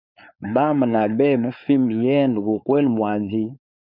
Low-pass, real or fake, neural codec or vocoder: 5.4 kHz; fake; codec, 16 kHz, 4.8 kbps, FACodec